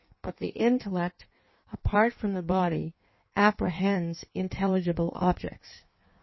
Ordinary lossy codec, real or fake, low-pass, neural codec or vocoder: MP3, 24 kbps; fake; 7.2 kHz; codec, 16 kHz in and 24 kHz out, 1.1 kbps, FireRedTTS-2 codec